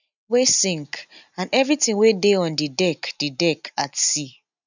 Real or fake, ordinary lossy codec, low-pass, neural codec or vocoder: real; none; 7.2 kHz; none